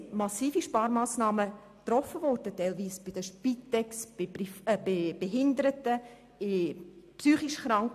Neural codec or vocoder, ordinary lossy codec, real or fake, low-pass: none; MP3, 96 kbps; real; 14.4 kHz